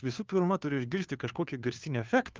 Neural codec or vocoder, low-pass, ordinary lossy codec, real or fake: codec, 16 kHz, 2 kbps, FunCodec, trained on Chinese and English, 25 frames a second; 7.2 kHz; Opus, 24 kbps; fake